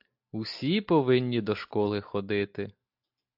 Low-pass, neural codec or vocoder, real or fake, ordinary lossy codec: 5.4 kHz; none; real; AAC, 48 kbps